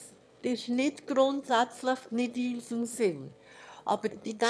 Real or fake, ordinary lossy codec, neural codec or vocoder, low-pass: fake; none; autoencoder, 22.05 kHz, a latent of 192 numbers a frame, VITS, trained on one speaker; none